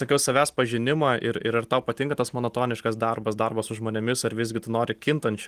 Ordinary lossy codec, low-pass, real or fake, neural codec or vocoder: Opus, 24 kbps; 14.4 kHz; real; none